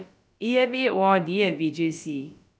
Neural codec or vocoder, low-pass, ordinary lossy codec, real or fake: codec, 16 kHz, about 1 kbps, DyCAST, with the encoder's durations; none; none; fake